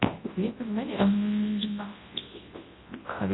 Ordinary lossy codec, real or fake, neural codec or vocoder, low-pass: AAC, 16 kbps; fake; codec, 24 kHz, 0.9 kbps, WavTokenizer, large speech release; 7.2 kHz